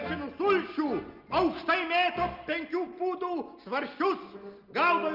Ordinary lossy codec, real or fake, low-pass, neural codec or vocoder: Opus, 32 kbps; real; 5.4 kHz; none